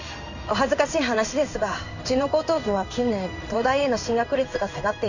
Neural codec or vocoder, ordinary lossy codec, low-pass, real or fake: codec, 16 kHz in and 24 kHz out, 1 kbps, XY-Tokenizer; none; 7.2 kHz; fake